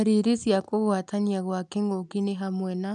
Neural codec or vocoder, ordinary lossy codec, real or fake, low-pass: none; none; real; 10.8 kHz